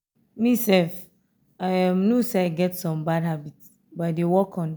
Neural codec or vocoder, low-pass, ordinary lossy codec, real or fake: none; none; none; real